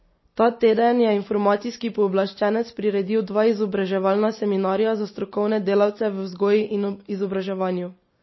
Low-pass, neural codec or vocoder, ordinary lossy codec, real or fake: 7.2 kHz; none; MP3, 24 kbps; real